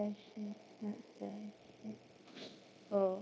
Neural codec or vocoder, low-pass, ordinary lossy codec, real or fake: codec, 16 kHz, 0.9 kbps, LongCat-Audio-Codec; none; none; fake